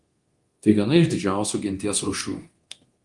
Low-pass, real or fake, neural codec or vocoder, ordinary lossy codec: 10.8 kHz; fake; codec, 24 kHz, 0.9 kbps, DualCodec; Opus, 24 kbps